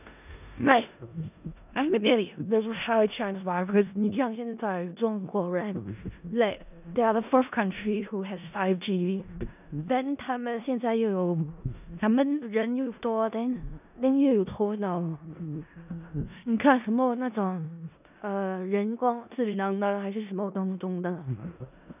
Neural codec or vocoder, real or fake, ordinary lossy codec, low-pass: codec, 16 kHz in and 24 kHz out, 0.4 kbps, LongCat-Audio-Codec, four codebook decoder; fake; none; 3.6 kHz